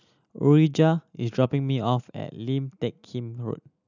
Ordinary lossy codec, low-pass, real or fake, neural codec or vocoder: none; 7.2 kHz; real; none